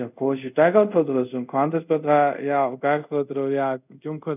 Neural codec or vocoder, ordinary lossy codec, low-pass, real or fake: codec, 24 kHz, 0.5 kbps, DualCodec; none; 3.6 kHz; fake